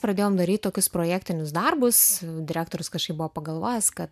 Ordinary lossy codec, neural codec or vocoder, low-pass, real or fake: MP3, 96 kbps; none; 14.4 kHz; real